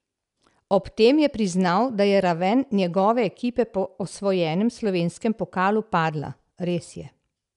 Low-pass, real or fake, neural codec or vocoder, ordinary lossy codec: 10.8 kHz; real; none; none